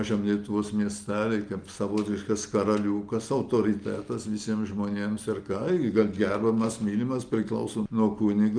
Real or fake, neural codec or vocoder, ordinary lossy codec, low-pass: real; none; Opus, 32 kbps; 9.9 kHz